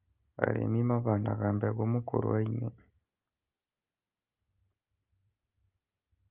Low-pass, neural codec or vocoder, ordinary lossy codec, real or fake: 3.6 kHz; none; Opus, 24 kbps; real